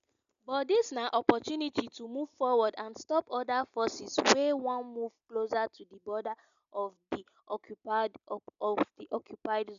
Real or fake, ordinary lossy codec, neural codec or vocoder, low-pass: real; MP3, 96 kbps; none; 7.2 kHz